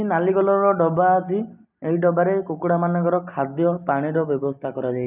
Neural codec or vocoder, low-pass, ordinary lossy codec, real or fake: none; 3.6 kHz; none; real